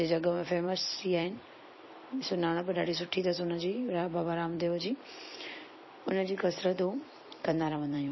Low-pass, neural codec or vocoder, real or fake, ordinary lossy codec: 7.2 kHz; vocoder, 44.1 kHz, 128 mel bands every 256 samples, BigVGAN v2; fake; MP3, 24 kbps